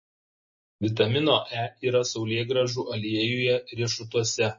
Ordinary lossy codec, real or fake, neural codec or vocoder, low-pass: MP3, 32 kbps; real; none; 7.2 kHz